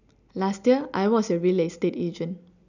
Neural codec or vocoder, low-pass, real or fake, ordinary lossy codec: none; 7.2 kHz; real; none